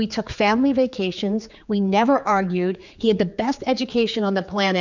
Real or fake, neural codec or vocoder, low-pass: fake; codec, 16 kHz, 4 kbps, X-Codec, HuBERT features, trained on general audio; 7.2 kHz